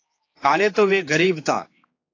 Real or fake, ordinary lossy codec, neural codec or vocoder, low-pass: fake; AAC, 32 kbps; codec, 16 kHz, 4 kbps, X-Codec, HuBERT features, trained on general audio; 7.2 kHz